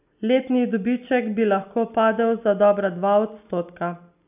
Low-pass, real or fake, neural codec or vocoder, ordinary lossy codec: 3.6 kHz; real; none; none